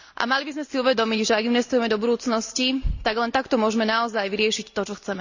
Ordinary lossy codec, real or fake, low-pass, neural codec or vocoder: Opus, 64 kbps; real; 7.2 kHz; none